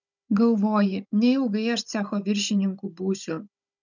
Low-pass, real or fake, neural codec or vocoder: 7.2 kHz; fake; codec, 16 kHz, 16 kbps, FunCodec, trained on Chinese and English, 50 frames a second